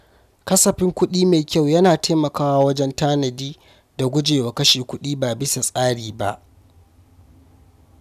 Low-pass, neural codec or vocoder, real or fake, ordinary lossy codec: 14.4 kHz; none; real; none